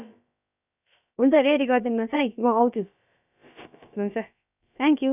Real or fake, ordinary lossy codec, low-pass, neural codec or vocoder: fake; none; 3.6 kHz; codec, 16 kHz, about 1 kbps, DyCAST, with the encoder's durations